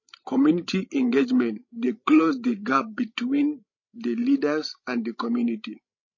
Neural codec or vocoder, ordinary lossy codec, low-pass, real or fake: codec, 16 kHz, 16 kbps, FreqCodec, larger model; MP3, 32 kbps; 7.2 kHz; fake